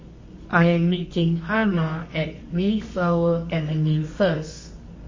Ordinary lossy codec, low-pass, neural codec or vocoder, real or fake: MP3, 32 kbps; 7.2 kHz; codec, 24 kHz, 0.9 kbps, WavTokenizer, medium music audio release; fake